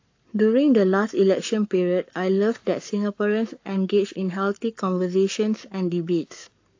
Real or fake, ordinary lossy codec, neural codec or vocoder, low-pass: fake; AAC, 48 kbps; codec, 44.1 kHz, 7.8 kbps, Pupu-Codec; 7.2 kHz